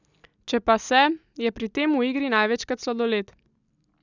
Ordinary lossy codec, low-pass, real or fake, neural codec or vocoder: none; 7.2 kHz; real; none